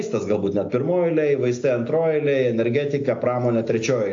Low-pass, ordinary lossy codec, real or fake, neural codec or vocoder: 7.2 kHz; AAC, 48 kbps; real; none